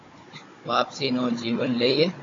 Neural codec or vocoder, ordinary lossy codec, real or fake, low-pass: codec, 16 kHz, 16 kbps, FunCodec, trained on Chinese and English, 50 frames a second; AAC, 32 kbps; fake; 7.2 kHz